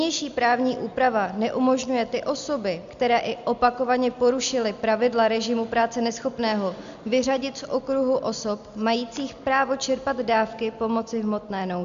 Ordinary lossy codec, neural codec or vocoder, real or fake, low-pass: MP3, 64 kbps; none; real; 7.2 kHz